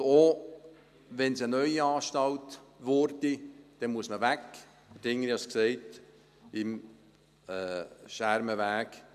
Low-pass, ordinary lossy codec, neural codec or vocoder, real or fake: 14.4 kHz; none; none; real